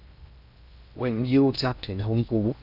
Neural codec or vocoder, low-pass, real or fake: codec, 16 kHz in and 24 kHz out, 0.6 kbps, FocalCodec, streaming, 2048 codes; 5.4 kHz; fake